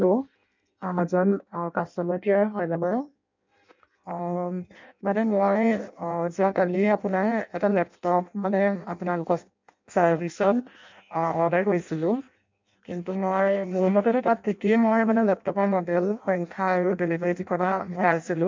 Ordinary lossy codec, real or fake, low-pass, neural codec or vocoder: MP3, 64 kbps; fake; 7.2 kHz; codec, 16 kHz in and 24 kHz out, 0.6 kbps, FireRedTTS-2 codec